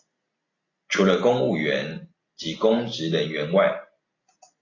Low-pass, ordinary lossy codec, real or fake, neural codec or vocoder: 7.2 kHz; AAC, 32 kbps; real; none